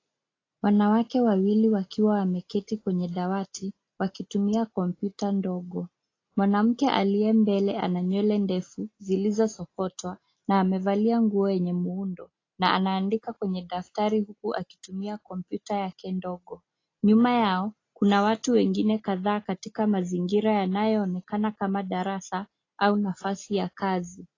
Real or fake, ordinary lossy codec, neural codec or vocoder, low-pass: real; AAC, 32 kbps; none; 7.2 kHz